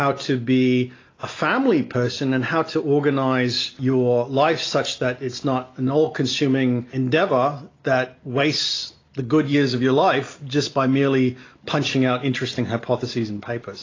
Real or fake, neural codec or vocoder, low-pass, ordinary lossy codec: real; none; 7.2 kHz; AAC, 32 kbps